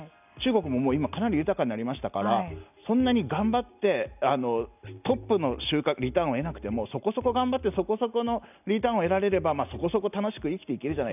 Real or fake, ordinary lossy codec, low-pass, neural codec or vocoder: real; none; 3.6 kHz; none